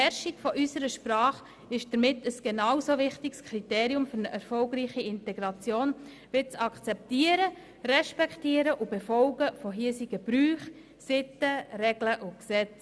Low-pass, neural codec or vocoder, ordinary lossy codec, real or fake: none; none; none; real